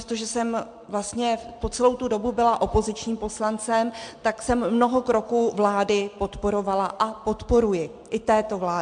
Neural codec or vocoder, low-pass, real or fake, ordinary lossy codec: none; 9.9 kHz; real; MP3, 64 kbps